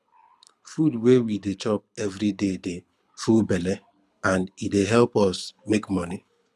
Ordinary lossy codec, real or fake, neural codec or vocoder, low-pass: none; fake; codec, 24 kHz, 6 kbps, HILCodec; none